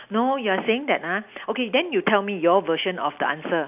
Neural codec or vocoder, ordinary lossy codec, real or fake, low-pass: none; none; real; 3.6 kHz